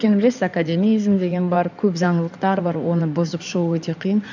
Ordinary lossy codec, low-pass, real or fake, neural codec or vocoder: none; 7.2 kHz; fake; codec, 16 kHz in and 24 kHz out, 2.2 kbps, FireRedTTS-2 codec